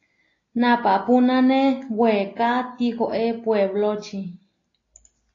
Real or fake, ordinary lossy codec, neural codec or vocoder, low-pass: real; AAC, 32 kbps; none; 7.2 kHz